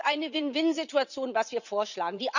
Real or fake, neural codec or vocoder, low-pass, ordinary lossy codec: fake; vocoder, 44.1 kHz, 80 mel bands, Vocos; 7.2 kHz; none